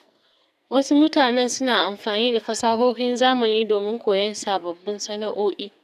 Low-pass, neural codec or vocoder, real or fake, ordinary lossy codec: 14.4 kHz; codec, 44.1 kHz, 2.6 kbps, SNAC; fake; none